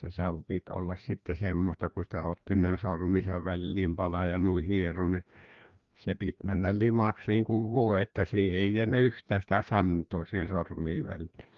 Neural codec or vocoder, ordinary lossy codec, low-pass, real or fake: codec, 16 kHz, 1 kbps, FreqCodec, larger model; Opus, 32 kbps; 7.2 kHz; fake